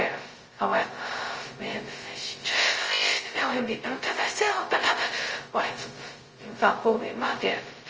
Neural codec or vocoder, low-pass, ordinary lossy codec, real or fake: codec, 16 kHz, 0.2 kbps, FocalCodec; 7.2 kHz; Opus, 24 kbps; fake